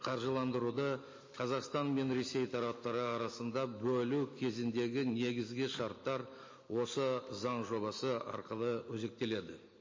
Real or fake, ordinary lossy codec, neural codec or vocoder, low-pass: real; MP3, 32 kbps; none; 7.2 kHz